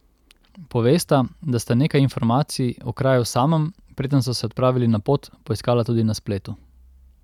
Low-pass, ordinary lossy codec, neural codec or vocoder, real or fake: 19.8 kHz; none; none; real